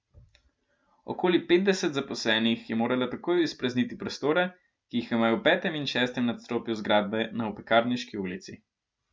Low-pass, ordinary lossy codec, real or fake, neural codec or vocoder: none; none; real; none